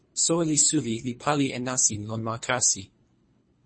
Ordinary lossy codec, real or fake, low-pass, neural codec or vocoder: MP3, 32 kbps; fake; 9.9 kHz; codec, 24 kHz, 3 kbps, HILCodec